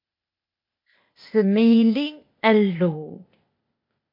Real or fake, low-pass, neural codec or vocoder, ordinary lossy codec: fake; 5.4 kHz; codec, 16 kHz, 0.8 kbps, ZipCodec; MP3, 32 kbps